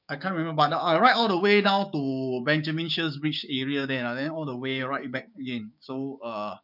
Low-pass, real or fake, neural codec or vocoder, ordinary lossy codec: 5.4 kHz; fake; codec, 16 kHz, 6 kbps, DAC; none